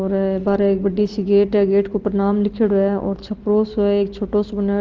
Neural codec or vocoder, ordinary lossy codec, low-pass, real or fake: none; Opus, 16 kbps; 7.2 kHz; real